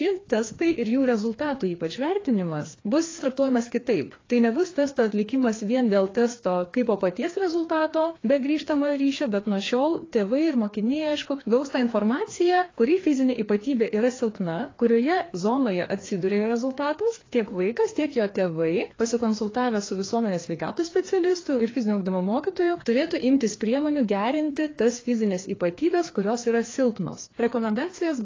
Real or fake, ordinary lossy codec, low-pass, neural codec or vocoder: fake; AAC, 32 kbps; 7.2 kHz; codec, 16 kHz, 2 kbps, FreqCodec, larger model